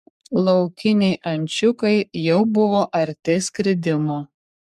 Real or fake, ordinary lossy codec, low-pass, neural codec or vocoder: fake; MP3, 96 kbps; 14.4 kHz; codec, 44.1 kHz, 3.4 kbps, Pupu-Codec